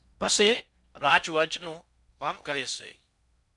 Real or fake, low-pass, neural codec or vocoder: fake; 10.8 kHz; codec, 16 kHz in and 24 kHz out, 0.6 kbps, FocalCodec, streaming, 4096 codes